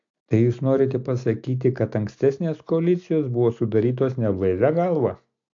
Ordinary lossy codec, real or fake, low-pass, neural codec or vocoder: AAC, 48 kbps; real; 7.2 kHz; none